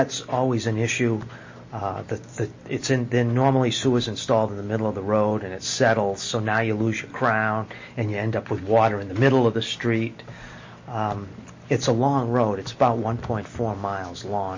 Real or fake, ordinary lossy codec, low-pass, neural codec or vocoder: real; MP3, 32 kbps; 7.2 kHz; none